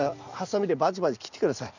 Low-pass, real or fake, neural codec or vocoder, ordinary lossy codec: 7.2 kHz; real; none; none